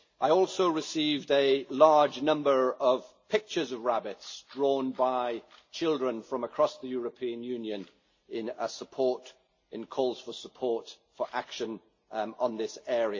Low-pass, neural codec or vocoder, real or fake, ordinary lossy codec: 7.2 kHz; none; real; MP3, 32 kbps